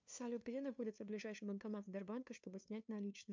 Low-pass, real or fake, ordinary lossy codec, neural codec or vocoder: 7.2 kHz; fake; MP3, 64 kbps; codec, 16 kHz, 2 kbps, FunCodec, trained on LibriTTS, 25 frames a second